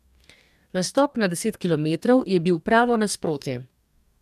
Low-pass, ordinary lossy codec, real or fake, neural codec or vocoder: 14.4 kHz; none; fake; codec, 44.1 kHz, 2.6 kbps, DAC